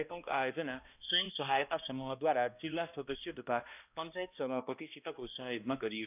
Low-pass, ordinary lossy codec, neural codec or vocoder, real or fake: 3.6 kHz; none; codec, 16 kHz, 1 kbps, X-Codec, HuBERT features, trained on balanced general audio; fake